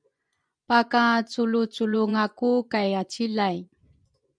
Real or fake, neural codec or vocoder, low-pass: fake; vocoder, 24 kHz, 100 mel bands, Vocos; 9.9 kHz